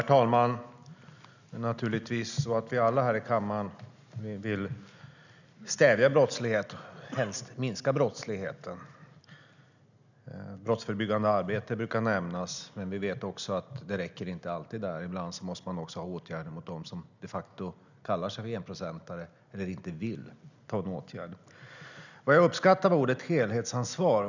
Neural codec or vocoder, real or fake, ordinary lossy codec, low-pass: none; real; none; 7.2 kHz